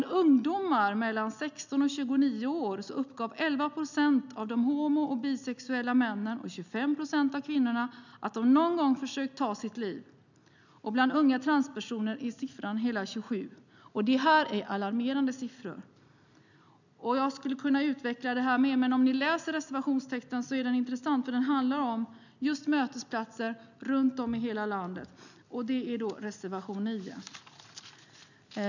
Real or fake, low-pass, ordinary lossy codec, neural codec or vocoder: real; 7.2 kHz; none; none